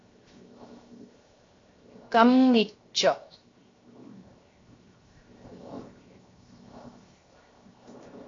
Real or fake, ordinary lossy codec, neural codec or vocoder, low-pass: fake; AAC, 32 kbps; codec, 16 kHz, 0.7 kbps, FocalCodec; 7.2 kHz